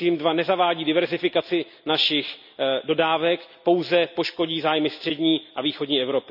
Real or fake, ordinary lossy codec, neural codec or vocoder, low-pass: real; none; none; 5.4 kHz